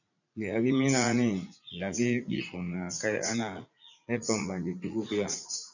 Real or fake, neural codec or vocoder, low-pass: fake; vocoder, 44.1 kHz, 80 mel bands, Vocos; 7.2 kHz